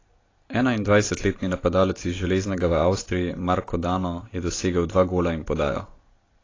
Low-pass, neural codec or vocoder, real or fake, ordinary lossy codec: 7.2 kHz; none; real; AAC, 32 kbps